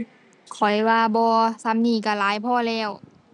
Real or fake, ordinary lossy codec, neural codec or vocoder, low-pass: real; none; none; 10.8 kHz